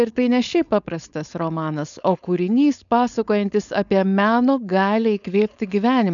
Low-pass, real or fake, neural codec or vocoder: 7.2 kHz; fake; codec, 16 kHz, 4.8 kbps, FACodec